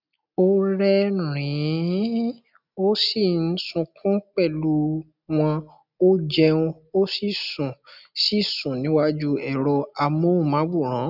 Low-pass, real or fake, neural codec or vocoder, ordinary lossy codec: 5.4 kHz; real; none; none